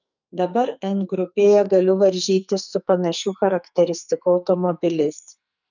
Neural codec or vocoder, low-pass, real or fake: codec, 44.1 kHz, 2.6 kbps, SNAC; 7.2 kHz; fake